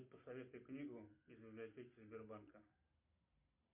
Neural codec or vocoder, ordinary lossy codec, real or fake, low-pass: none; MP3, 32 kbps; real; 3.6 kHz